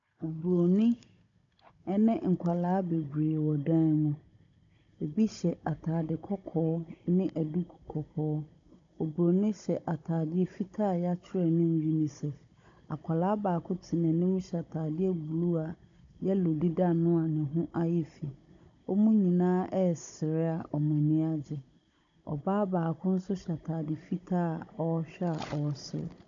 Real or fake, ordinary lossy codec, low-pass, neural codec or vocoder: fake; AAC, 64 kbps; 7.2 kHz; codec, 16 kHz, 16 kbps, FunCodec, trained on Chinese and English, 50 frames a second